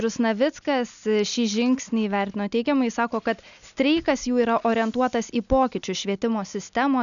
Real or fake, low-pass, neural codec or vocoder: real; 7.2 kHz; none